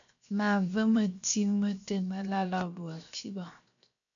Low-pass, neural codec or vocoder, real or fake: 7.2 kHz; codec, 16 kHz, 0.7 kbps, FocalCodec; fake